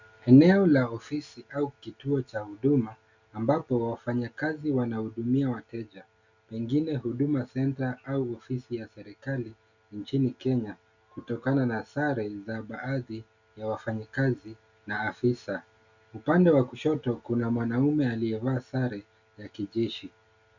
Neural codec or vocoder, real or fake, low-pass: none; real; 7.2 kHz